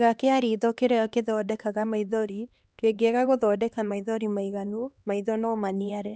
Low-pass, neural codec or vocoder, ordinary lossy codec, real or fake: none; codec, 16 kHz, 2 kbps, X-Codec, HuBERT features, trained on LibriSpeech; none; fake